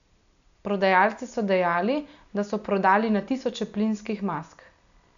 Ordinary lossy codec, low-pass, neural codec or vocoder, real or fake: none; 7.2 kHz; none; real